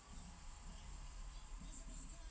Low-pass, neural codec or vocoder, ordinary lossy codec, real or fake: none; none; none; real